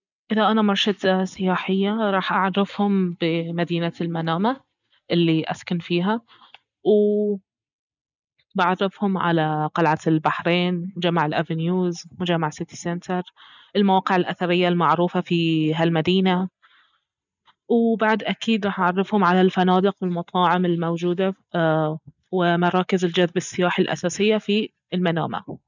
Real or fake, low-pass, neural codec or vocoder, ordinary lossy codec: real; 7.2 kHz; none; none